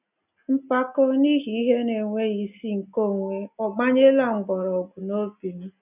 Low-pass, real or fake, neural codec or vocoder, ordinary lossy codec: 3.6 kHz; real; none; none